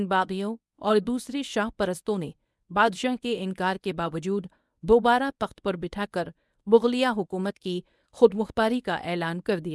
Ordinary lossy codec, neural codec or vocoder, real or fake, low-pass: none; codec, 24 kHz, 0.9 kbps, WavTokenizer, medium speech release version 1; fake; none